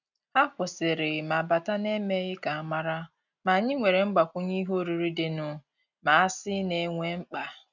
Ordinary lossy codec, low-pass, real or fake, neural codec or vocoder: none; 7.2 kHz; real; none